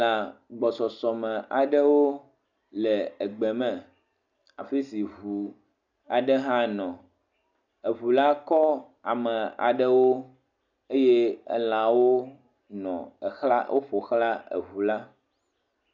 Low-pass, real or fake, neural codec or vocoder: 7.2 kHz; real; none